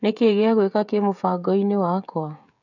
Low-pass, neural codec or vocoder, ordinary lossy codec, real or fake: 7.2 kHz; codec, 16 kHz, 16 kbps, FreqCodec, smaller model; none; fake